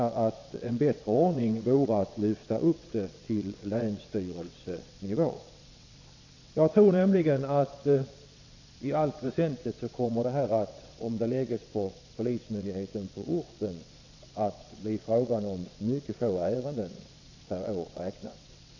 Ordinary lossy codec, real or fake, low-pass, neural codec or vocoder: AAC, 48 kbps; fake; 7.2 kHz; vocoder, 22.05 kHz, 80 mel bands, WaveNeXt